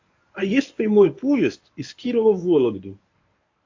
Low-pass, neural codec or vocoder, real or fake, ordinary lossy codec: 7.2 kHz; codec, 24 kHz, 0.9 kbps, WavTokenizer, medium speech release version 1; fake; AAC, 48 kbps